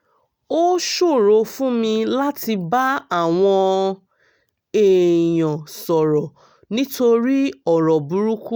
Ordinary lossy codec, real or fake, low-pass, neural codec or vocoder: none; real; none; none